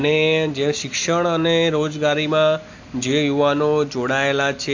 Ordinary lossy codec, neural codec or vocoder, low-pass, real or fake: AAC, 48 kbps; none; 7.2 kHz; real